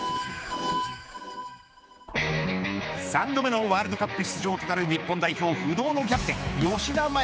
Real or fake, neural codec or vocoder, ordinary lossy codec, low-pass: fake; codec, 16 kHz, 4 kbps, X-Codec, HuBERT features, trained on general audio; none; none